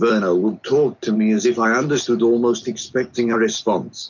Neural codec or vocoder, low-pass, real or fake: none; 7.2 kHz; real